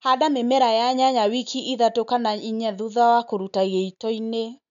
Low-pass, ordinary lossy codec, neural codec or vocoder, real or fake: 7.2 kHz; none; none; real